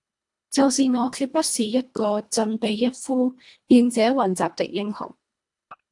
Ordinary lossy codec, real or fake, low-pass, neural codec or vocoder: AAC, 64 kbps; fake; 10.8 kHz; codec, 24 kHz, 1.5 kbps, HILCodec